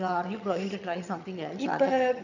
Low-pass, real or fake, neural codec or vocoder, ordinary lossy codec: 7.2 kHz; fake; vocoder, 22.05 kHz, 80 mel bands, HiFi-GAN; none